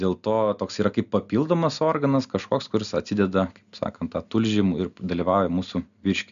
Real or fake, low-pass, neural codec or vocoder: real; 7.2 kHz; none